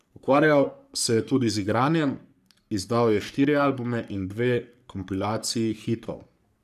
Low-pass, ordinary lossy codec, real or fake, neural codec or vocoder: 14.4 kHz; none; fake; codec, 44.1 kHz, 3.4 kbps, Pupu-Codec